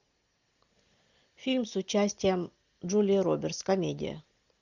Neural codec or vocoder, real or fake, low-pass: none; real; 7.2 kHz